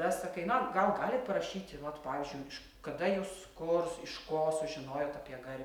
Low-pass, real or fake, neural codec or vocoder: 19.8 kHz; real; none